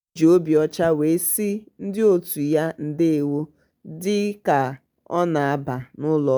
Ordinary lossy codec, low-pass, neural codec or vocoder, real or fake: none; none; none; real